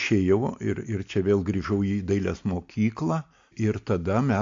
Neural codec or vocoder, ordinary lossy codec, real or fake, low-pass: none; MP3, 48 kbps; real; 7.2 kHz